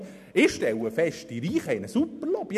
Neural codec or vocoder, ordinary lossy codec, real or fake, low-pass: none; none; real; 14.4 kHz